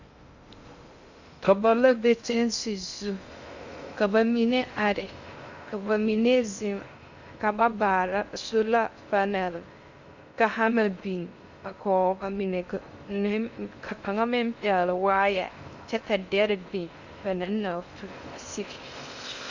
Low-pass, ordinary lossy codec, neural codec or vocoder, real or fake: 7.2 kHz; Opus, 64 kbps; codec, 16 kHz in and 24 kHz out, 0.6 kbps, FocalCodec, streaming, 2048 codes; fake